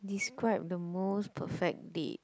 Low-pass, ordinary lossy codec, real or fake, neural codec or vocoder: none; none; real; none